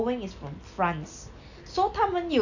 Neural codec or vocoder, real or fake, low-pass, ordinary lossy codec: none; real; 7.2 kHz; none